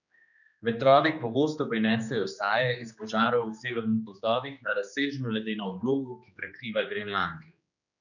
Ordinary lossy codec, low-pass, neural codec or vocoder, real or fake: none; 7.2 kHz; codec, 16 kHz, 2 kbps, X-Codec, HuBERT features, trained on general audio; fake